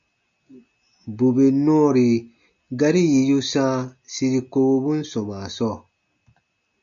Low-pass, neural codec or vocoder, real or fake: 7.2 kHz; none; real